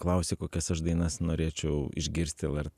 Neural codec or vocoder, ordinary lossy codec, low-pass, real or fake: none; Opus, 64 kbps; 14.4 kHz; real